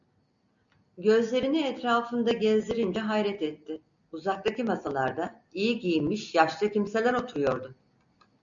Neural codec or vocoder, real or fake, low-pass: none; real; 7.2 kHz